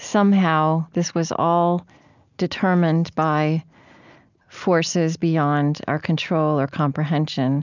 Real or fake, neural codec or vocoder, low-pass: real; none; 7.2 kHz